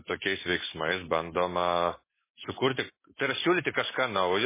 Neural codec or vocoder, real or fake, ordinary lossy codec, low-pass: none; real; MP3, 16 kbps; 3.6 kHz